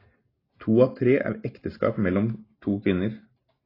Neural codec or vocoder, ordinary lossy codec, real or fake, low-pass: none; AAC, 24 kbps; real; 5.4 kHz